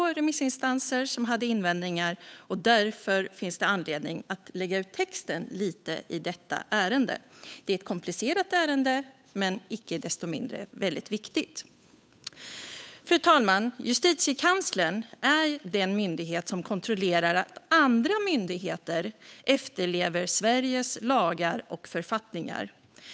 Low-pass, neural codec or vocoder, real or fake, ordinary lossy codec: none; codec, 16 kHz, 8 kbps, FunCodec, trained on Chinese and English, 25 frames a second; fake; none